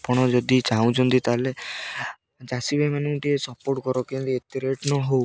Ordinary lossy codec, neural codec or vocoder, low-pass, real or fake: none; none; none; real